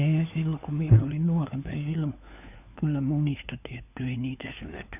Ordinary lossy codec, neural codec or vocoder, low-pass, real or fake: none; codec, 16 kHz, 4 kbps, FunCodec, trained on LibriTTS, 50 frames a second; 3.6 kHz; fake